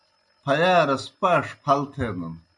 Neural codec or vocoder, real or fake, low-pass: none; real; 10.8 kHz